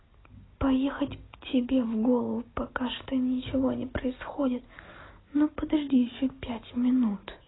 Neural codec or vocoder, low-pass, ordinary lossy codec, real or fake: none; 7.2 kHz; AAC, 16 kbps; real